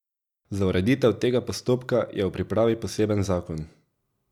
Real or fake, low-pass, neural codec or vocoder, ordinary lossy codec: real; 19.8 kHz; none; none